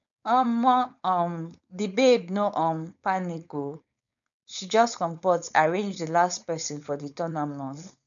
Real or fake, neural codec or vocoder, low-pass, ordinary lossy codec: fake; codec, 16 kHz, 4.8 kbps, FACodec; 7.2 kHz; none